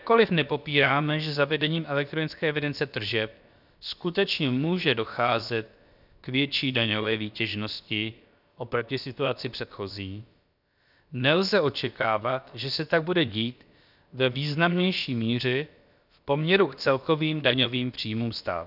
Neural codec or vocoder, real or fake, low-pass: codec, 16 kHz, about 1 kbps, DyCAST, with the encoder's durations; fake; 5.4 kHz